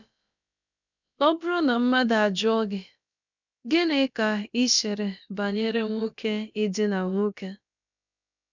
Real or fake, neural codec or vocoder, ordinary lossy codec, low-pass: fake; codec, 16 kHz, about 1 kbps, DyCAST, with the encoder's durations; none; 7.2 kHz